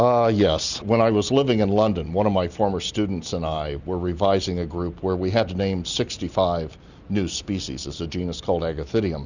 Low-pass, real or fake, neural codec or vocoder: 7.2 kHz; real; none